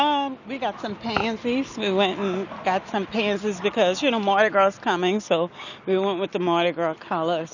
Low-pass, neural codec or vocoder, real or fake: 7.2 kHz; none; real